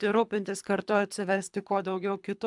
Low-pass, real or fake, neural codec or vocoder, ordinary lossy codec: 10.8 kHz; fake; codec, 24 kHz, 3 kbps, HILCodec; MP3, 96 kbps